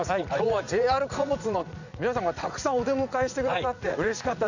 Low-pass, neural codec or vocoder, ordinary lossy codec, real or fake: 7.2 kHz; vocoder, 44.1 kHz, 80 mel bands, Vocos; none; fake